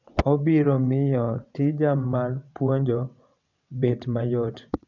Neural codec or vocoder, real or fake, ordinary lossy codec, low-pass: vocoder, 22.05 kHz, 80 mel bands, WaveNeXt; fake; none; 7.2 kHz